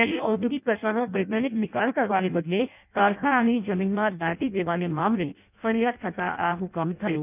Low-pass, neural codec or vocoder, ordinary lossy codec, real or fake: 3.6 kHz; codec, 16 kHz in and 24 kHz out, 0.6 kbps, FireRedTTS-2 codec; none; fake